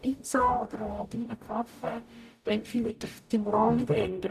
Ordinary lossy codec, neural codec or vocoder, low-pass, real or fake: none; codec, 44.1 kHz, 0.9 kbps, DAC; 14.4 kHz; fake